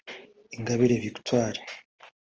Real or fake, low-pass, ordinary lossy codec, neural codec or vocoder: real; 7.2 kHz; Opus, 24 kbps; none